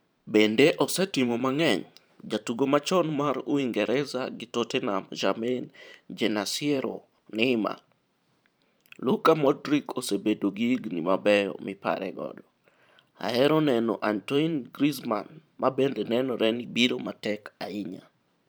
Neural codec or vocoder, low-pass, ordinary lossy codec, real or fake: vocoder, 44.1 kHz, 128 mel bands every 512 samples, BigVGAN v2; none; none; fake